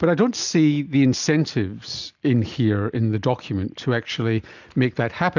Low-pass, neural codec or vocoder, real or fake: 7.2 kHz; none; real